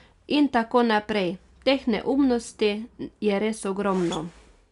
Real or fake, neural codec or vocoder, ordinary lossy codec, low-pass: real; none; none; 10.8 kHz